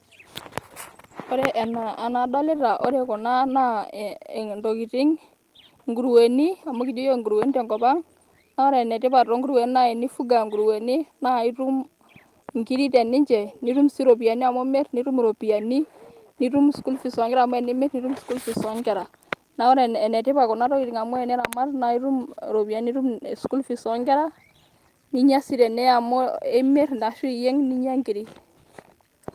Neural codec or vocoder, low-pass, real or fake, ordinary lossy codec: none; 14.4 kHz; real; Opus, 24 kbps